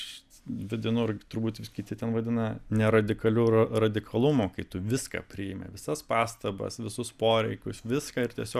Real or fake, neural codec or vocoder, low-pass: real; none; 14.4 kHz